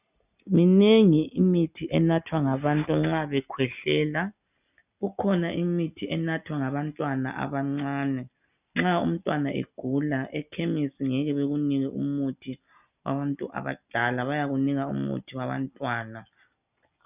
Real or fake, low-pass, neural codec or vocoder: real; 3.6 kHz; none